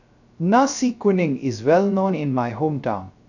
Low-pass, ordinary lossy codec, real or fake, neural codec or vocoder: 7.2 kHz; none; fake; codec, 16 kHz, 0.3 kbps, FocalCodec